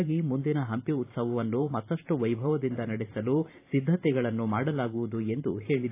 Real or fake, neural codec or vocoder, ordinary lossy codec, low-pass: fake; vocoder, 44.1 kHz, 128 mel bands every 512 samples, BigVGAN v2; AAC, 24 kbps; 3.6 kHz